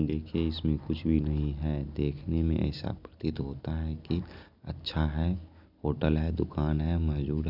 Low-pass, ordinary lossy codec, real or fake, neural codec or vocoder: 5.4 kHz; none; real; none